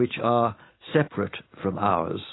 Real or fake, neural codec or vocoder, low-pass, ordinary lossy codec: real; none; 7.2 kHz; AAC, 16 kbps